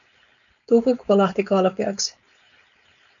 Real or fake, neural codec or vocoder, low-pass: fake; codec, 16 kHz, 4.8 kbps, FACodec; 7.2 kHz